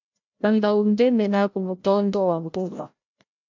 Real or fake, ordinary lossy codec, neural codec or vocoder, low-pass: fake; MP3, 64 kbps; codec, 16 kHz, 0.5 kbps, FreqCodec, larger model; 7.2 kHz